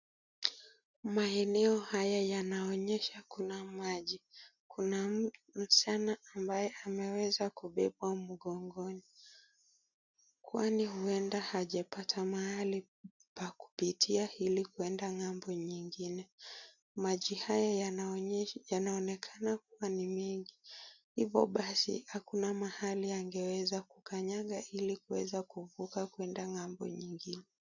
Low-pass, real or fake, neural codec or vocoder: 7.2 kHz; real; none